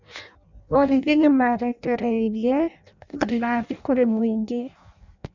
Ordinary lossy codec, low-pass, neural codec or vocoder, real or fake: none; 7.2 kHz; codec, 16 kHz in and 24 kHz out, 0.6 kbps, FireRedTTS-2 codec; fake